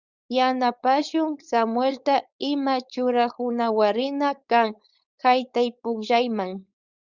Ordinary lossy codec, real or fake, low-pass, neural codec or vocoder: Opus, 64 kbps; fake; 7.2 kHz; codec, 16 kHz, 4.8 kbps, FACodec